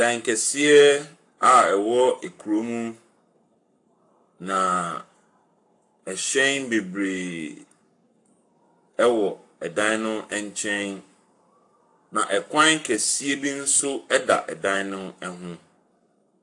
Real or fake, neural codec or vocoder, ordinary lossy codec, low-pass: fake; codec, 44.1 kHz, 7.8 kbps, Pupu-Codec; AAC, 64 kbps; 10.8 kHz